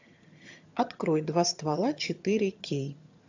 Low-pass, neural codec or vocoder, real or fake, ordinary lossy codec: 7.2 kHz; vocoder, 22.05 kHz, 80 mel bands, HiFi-GAN; fake; AAC, 48 kbps